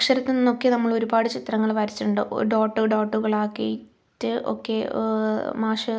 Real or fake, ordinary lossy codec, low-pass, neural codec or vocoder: real; none; none; none